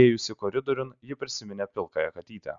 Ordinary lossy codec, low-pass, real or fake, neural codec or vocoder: Opus, 64 kbps; 7.2 kHz; real; none